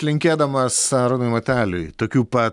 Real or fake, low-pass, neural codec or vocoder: real; 10.8 kHz; none